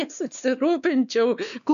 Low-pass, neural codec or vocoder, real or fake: 7.2 kHz; codec, 16 kHz, 2 kbps, X-Codec, WavLM features, trained on Multilingual LibriSpeech; fake